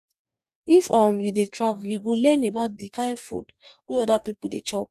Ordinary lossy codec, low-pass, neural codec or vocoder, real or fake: none; 14.4 kHz; codec, 44.1 kHz, 2.6 kbps, DAC; fake